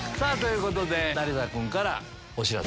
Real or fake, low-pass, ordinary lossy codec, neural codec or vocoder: real; none; none; none